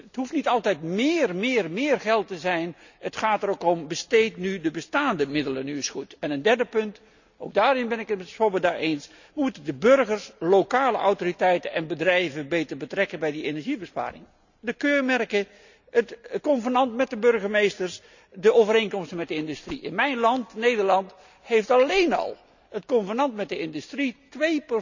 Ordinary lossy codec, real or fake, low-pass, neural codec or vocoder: none; real; 7.2 kHz; none